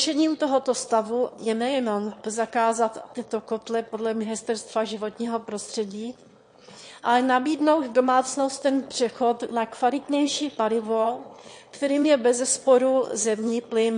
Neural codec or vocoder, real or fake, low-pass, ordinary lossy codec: autoencoder, 22.05 kHz, a latent of 192 numbers a frame, VITS, trained on one speaker; fake; 9.9 kHz; MP3, 48 kbps